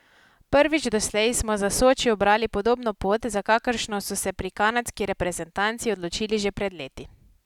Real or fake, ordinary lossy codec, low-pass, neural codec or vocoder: real; none; 19.8 kHz; none